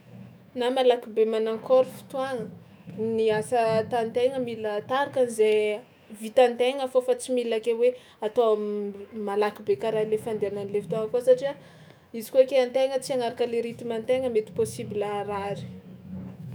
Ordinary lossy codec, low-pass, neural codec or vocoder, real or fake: none; none; autoencoder, 48 kHz, 128 numbers a frame, DAC-VAE, trained on Japanese speech; fake